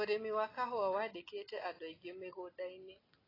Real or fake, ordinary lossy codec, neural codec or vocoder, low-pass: real; AAC, 24 kbps; none; 5.4 kHz